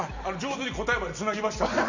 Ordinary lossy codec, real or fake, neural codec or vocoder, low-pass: Opus, 64 kbps; real; none; 7.2 kHz